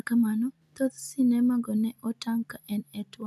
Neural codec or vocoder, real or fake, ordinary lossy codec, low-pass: none; real; none; 14.4 kHz